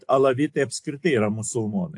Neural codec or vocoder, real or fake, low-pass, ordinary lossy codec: none; real; 10.8 kHz; AAC, 64 kbps